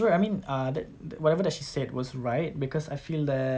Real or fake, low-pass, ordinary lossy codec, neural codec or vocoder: real; none; none; none